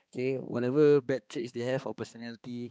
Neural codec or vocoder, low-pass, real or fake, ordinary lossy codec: codec, 16 kHz, 2 kbps, X-Codec, HuBERT features, trained on balanced general audio; none; fake; none